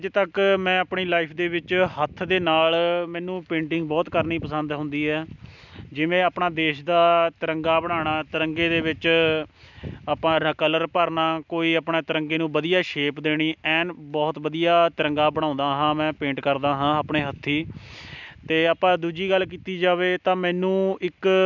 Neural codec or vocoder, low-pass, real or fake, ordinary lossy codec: none; 7.2 kHz; real; none